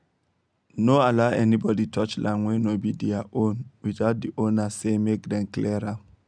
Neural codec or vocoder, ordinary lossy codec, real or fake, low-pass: none; none; real; 9.9 kHz